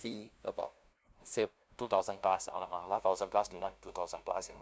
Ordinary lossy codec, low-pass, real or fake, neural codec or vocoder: none; none; fake; codec, 16 kHz, 0.5 kbps, FunCodec, trained on LibriTTS, 25 frames a second